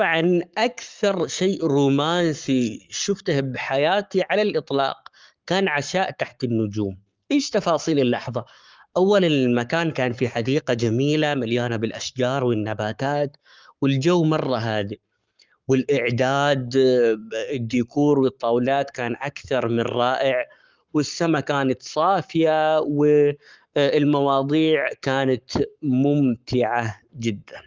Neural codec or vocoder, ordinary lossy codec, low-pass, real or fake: codec, 44.1 kHz, 7.8 kbps, Pupu-Codec; Opus, 32 kbps; 7.2 kHz; fake